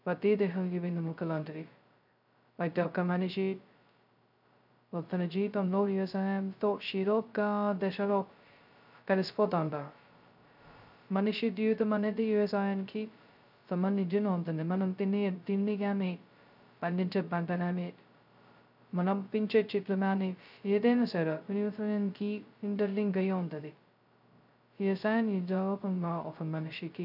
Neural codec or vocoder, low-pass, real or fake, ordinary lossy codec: codec, 16 kHz, 0.2 kbps, FocalCodec; 5.4 kHz; fake; none